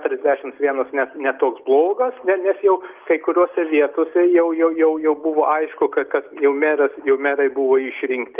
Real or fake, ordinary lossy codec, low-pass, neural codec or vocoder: real; Opus, 32 kbps; 3.6 kHz; none